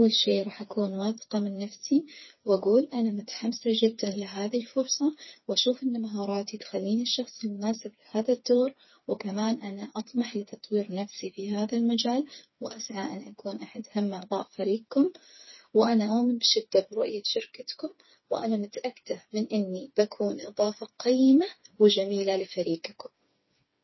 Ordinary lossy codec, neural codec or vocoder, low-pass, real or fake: MP3, 24 kbps; codec, 16 kHz, 4 kbps, FreqCodec, smaller model; 7.2 kHz; fake